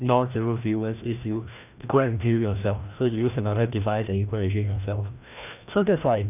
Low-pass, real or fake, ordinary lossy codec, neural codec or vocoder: 3.6 kHz; fake; none; codec, 16 kHz, 1 kbps, FreqCodec, larger model